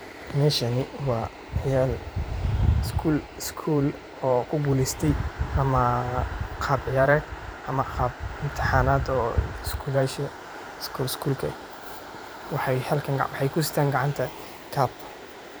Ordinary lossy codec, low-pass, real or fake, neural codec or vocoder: none; none; fake; vocoder, 44.1 kHz, 128 mel bands every 512 samples, BigVGAN v2